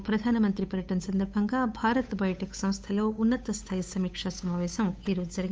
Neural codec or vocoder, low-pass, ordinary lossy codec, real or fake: codec, 16 kHz, 8 kbps, FunCodec, trained on Chinese and English, 25 frames a second; none; none; fake